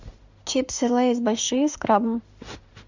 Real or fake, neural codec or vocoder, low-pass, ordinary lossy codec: fake; autoencoder, 48 kHz, 32 numbers a frame, DAC-VAE, trained on Japanese speech; 7.2 kHz; Opus, 64 kbps